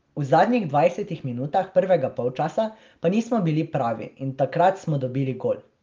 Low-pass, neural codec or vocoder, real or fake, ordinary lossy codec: 7.2 kHz; none; real; Opus, 32 kbps